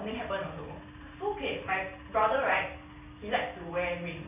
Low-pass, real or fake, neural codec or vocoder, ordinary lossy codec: 3.6 kHz; real; none; none